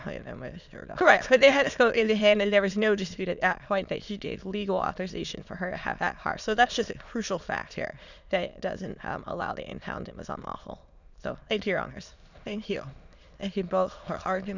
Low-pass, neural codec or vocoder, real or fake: 7.2 kHz; autoencoder, 22.05 kHz, a latent of 192 numbers a frame, VITS, trained on many speakers; fake